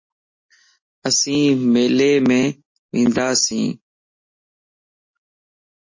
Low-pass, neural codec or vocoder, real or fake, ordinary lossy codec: 7.2 kHz; none; real; MP3, 32 kbps